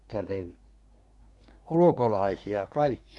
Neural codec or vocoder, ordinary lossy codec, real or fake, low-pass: codec, 24 kHz, 1 kbps, SNAC; none; fake; 10.8 kHz